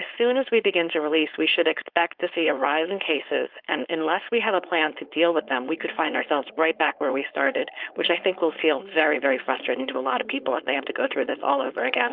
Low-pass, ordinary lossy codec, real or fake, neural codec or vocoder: 5.4 kHz; Opus, 24 kbps; fake; codec, 16 kHz, 4.8 kbps, FACodec